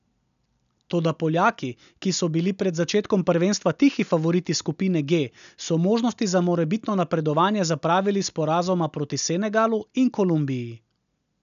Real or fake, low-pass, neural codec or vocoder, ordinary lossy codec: real; 7.2 kHz; none; none